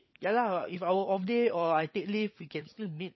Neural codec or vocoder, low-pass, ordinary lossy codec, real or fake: codec, 16 kHz, 16 kbps, FunCodec, trained on LibriTTS, 50 frames a second; 7.2 kHz; MP3, 24 kbps; fake